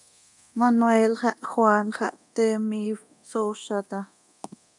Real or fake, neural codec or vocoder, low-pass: fake; codec, 24 kHz, 0.9 kbps, DualCodec; 10.8 kHz